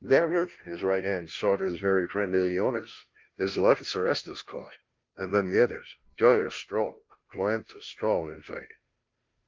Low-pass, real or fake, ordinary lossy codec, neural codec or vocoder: 7.2 kHz; fake; Opus, 32 kbps; codec, 16 kHz, 0.5 kbps, FunCodec, trained on Chinese and English, 25 frames a second